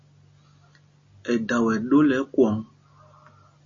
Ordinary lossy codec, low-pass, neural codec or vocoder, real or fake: MP3, 32 kbps; 7.2 kHz; none; real